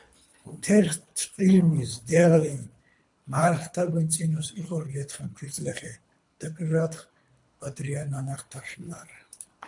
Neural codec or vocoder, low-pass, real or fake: codec, 24 kHz, 3 kbps, HILCodec; 10.8 kHz; fake